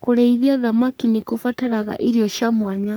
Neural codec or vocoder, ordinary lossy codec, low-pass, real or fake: codec, 44.1 kHz, 3.4 kbps, Pupu-Codec; none; none; fake